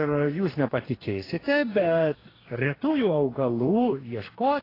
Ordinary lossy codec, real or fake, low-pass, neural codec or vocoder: AAC, 24 kbps; fake; 5.4 kHz; codec, 44.1 kHz, 2.6 kbps, DAC